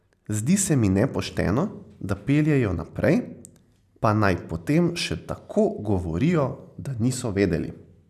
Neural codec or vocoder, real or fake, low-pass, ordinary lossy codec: none; real; 14.4 kHz; none